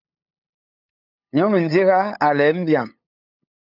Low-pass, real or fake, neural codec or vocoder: 5.4 kHz; fake; codec, 16 kHz, 8 kbps, FunCodec, trained on LibriTTS, 25 frames a second